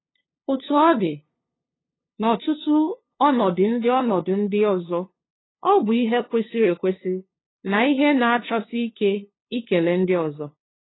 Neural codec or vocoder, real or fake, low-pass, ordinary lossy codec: codec, 16 kHz, 2 kbps, FunCodec, trained on LibriTTS, 25 frames a second; fake; 7.2 kHz; AAC, 16 kbps